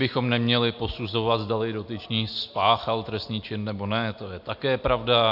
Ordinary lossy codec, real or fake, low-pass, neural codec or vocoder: AAC, 48 kbps; real; 5.4 kHz; none